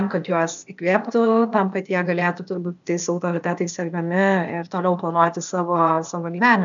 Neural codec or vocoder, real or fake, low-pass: codec, 16 kHz, 0.8 kbps, ZipCodec; fake; 7.2 kHz